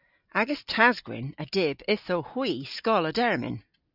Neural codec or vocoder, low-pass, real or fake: none; 5.4 kHz; real